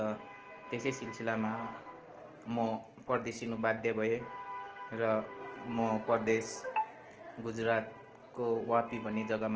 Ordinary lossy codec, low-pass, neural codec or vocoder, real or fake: Opus, 16 kbps; 7.2 kHz; none; real